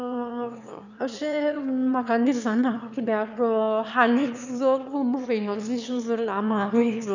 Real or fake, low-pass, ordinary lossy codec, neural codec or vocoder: fake; 7.2 kHz; none; autoencoder, 22.05 kHz, a latent of 192 numbers a frame, VITS, trained on one speaker